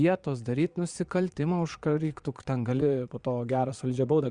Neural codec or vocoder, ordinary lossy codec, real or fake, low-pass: vocoder, 22.05 kHz, 80 mel bands, Vocos; Opus, 64 kbps; fake; 9.9 kHz